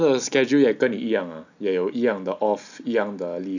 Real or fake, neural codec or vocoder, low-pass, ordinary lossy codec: real; none; 7.2 kHz; none